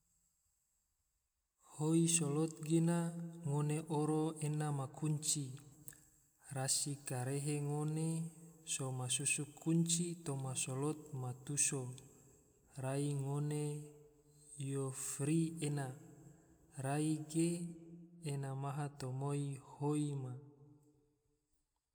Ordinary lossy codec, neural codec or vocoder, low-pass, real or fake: none; none; none; real